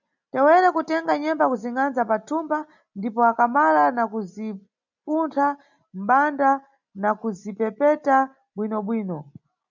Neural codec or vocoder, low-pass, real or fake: none; 7.2 kHz; real